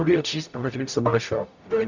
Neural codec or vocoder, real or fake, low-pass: codec, 44.1 kHz, 0.9 kbps, DAC; fake; 7.2 kHz